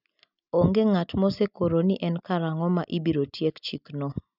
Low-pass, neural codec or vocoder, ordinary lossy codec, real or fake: 5.4 kHz; none; none; real